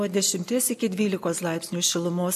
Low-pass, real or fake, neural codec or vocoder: 14.4 kHz; real; none